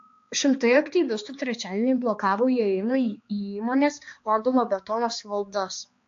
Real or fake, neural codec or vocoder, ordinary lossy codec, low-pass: fake; codec, 16 kHz, 2 kbps, X-Codec, HuBERT features, trained on balanced general audio; AAC, 48 kbps; 7.2 kHz